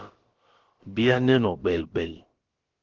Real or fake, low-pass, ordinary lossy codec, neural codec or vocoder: fake; 7.2 kHz; Opus, 16 kbps; codec, 16 kHz, about 1 kbps, DyCAST, with the encoder's durations